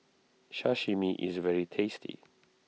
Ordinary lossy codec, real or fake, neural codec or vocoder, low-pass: none; real; none; none